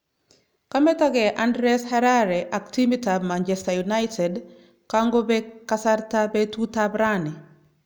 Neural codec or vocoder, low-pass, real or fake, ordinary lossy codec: none; none; real; none